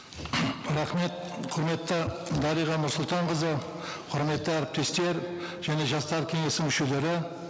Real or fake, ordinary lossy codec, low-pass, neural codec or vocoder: real; none; none; none